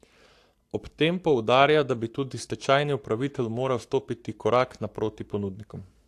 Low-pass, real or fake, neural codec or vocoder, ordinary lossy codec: 14.4 kHz; fake; codec, 44.1 kHz, 7.8 kbps, Pupu-Codec; AAC, 64 kbps